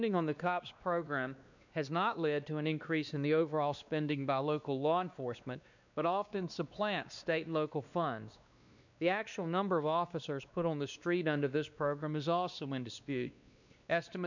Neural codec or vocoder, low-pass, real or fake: codec, 16 kHz, 2 kbps, X-Codec, WavLM features, trained on Multilingual LibriSpeech; 7.2 kHz; fake